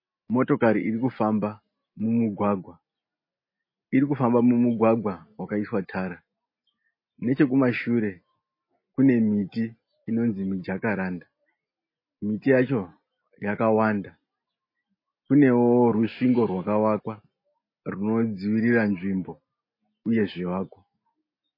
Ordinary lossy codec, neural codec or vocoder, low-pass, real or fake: MP3, 24 kbps; none; 5.4 kHz; real